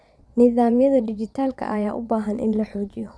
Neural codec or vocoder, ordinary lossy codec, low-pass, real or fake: vocoder, 22.05 kHz, 80 mel bands, Vocos; none; none; fake